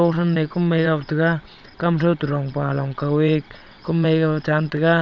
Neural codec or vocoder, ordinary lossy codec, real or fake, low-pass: vocoder, 22.05 kHz, 80 mel bands, WaveNeXt; none; fake; 7.2 kHz